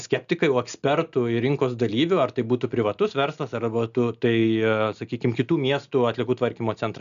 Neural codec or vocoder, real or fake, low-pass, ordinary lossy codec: none; real; 7.2 kHz; MP3, 96 kbps